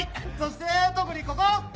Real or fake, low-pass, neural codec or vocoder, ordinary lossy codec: real; none; none; none